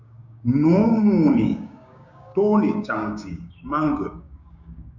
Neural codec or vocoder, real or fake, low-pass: codec, 16 kHz, 6 kbps, DAC; fake; 7.2 kHz